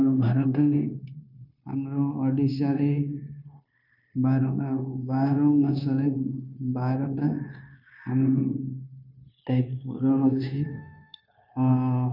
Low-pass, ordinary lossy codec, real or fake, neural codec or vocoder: 5.4 kHz; none; fake; codec, 16 kHz, 0.9 kbps, LongCat-Audio-Codec